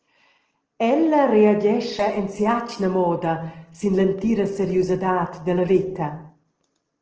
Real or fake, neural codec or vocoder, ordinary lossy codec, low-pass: real; none; Opus, 16 kbps; 7.2 kHz